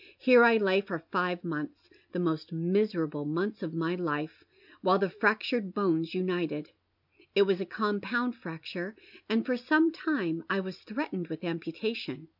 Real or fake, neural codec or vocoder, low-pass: real; none; 5.4 kHz